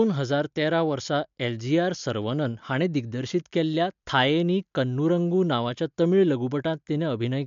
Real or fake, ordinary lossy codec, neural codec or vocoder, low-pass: real; MP3, 64 kbps; none; 7.2 kHz